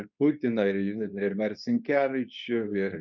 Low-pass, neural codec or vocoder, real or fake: 7.2 kHz; codec, 24 kHz, 0.9 kbps, WavTokenizer, medium speech release version 2; fake